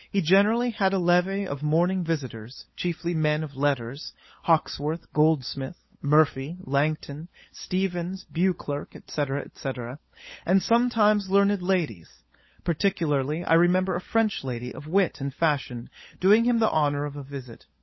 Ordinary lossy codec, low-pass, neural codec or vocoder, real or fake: MP3, 24 kbps; 7.2 kHz; codec, 16 kHz, 16 kbps, FunCodec, trained on LibriTTS, 50 frames a second; fake